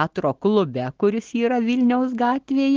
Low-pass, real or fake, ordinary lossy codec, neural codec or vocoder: 7.2 kHz; real; Opus, 16 kbps; none